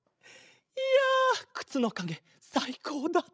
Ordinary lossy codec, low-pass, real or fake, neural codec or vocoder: none; none; fake; codec, 16 kHz, 16 kbps, FreqCodec, larger model